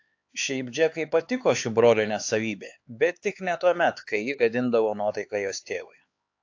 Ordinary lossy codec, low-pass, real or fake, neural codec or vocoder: AAC, 48 kbps; 7.2 kHz; fake; codec, 16 kHz, 4 kbps, X-Codec, HuBERT features, trained on LibriSpeech